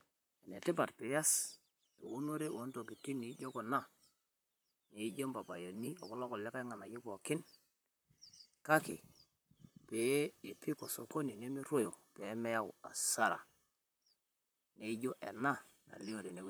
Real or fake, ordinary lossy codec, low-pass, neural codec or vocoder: fake; none; none; vocoder, 44.1 kHz, 128 mel bands, Pupu-Vocoder